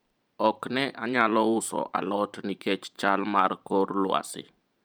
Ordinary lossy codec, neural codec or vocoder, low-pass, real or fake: none; vocoder, 44.1 kHz, 128 mel bands every 512 samples, BigVGAN v2; none; fake